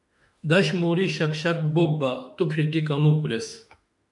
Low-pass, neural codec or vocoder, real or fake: 10.8 kHz; autoencoder, 48 kHz, 32 numbers a frame, DAC-VAE, trained on Japanese speech; fake